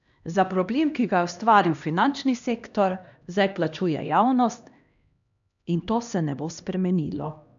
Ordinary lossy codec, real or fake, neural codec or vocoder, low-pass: none; fake; codec, 16 kHz, 1 kbps, X-Codec, HuBERT features, trained on LibriSpeech; 7.2 kHz